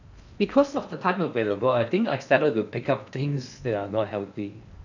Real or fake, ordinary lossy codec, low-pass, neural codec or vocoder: fake; none; 7.2 kHz; codec, 16 kHz in and 24 kHz out, 0.8 kbps, FocalCodec, streaming, 65536 codes